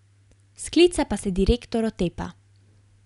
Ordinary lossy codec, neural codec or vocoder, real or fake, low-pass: none; none; real; 10.8 kHz